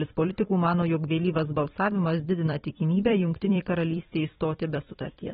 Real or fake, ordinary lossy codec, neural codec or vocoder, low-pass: fake; AAC, 16 kbps; vocoder, 44.1 kHz, 128 mel bands every 256 samples, BigVGAN v2; 19.8 kHz